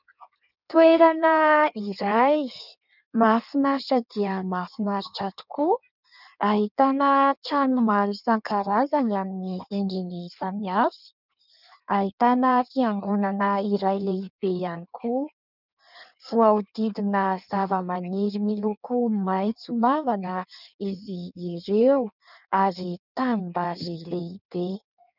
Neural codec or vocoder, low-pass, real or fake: codec, 16 kHz in and 24 kHz out, 1.1 kbps, FireRedTTS-2 codec; 5.4 kHz; fake